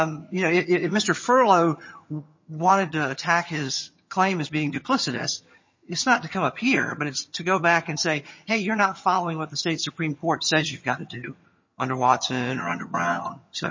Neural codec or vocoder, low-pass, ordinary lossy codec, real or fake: vocoder, 22.05 kHz, 80 mel bands, HiFi-GAN; 7.2 kHz; MP3, 32 kbps; fake